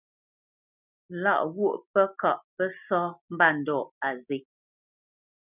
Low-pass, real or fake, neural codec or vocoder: 3.6 kHz; real; none